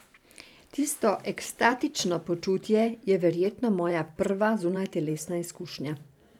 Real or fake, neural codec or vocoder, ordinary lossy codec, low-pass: fake; vocoder, 44.1 kHz, 128 mel bands every 512 samples, BigVGAN v2; none; 19.8 kHz